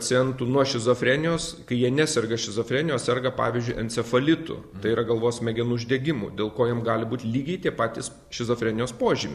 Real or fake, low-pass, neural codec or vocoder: real; 14.4 kHz; none